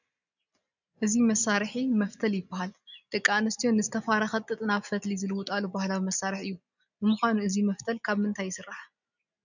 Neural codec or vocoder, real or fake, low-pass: none; real; 7.2 kHz